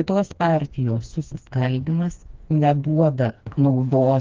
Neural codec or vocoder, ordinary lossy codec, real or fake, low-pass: codec, 16 kHz, 2 kbps, FreqCodec, smaller model; Opus, 24 kbps; fake; 7.2 kHz